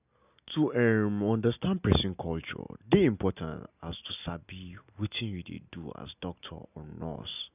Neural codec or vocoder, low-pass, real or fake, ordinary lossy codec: none; 3.6 kHz; real; none